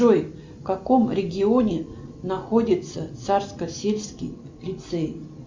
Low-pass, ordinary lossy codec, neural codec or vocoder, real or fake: 7.2 kHz; MP3, 64 kbps; none; real